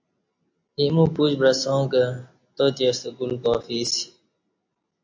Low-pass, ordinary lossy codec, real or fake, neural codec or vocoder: 7.2 kHz; AAC, 48 kbps; real; none